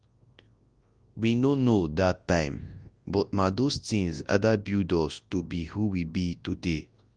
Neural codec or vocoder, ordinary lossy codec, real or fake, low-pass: codec, 24 kHz, 0.9 kbps, WavTokenizer, large speech release; Opus, 32 kbps; fake; 9.9 kHz